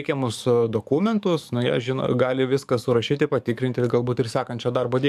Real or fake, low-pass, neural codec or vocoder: fake; 14.4 kHz; codec, 44.1 kHz, 7.8 kbps, DAC